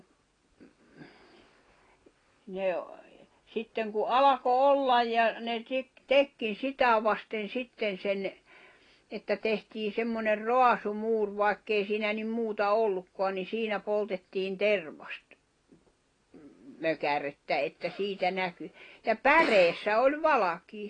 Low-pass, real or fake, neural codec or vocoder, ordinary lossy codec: 9.9 kHz; real; none; AAC, 32 kbps